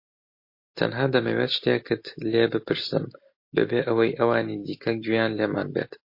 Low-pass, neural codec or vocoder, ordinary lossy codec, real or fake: 5.4 kHz; none; MP3, 32 kbps; real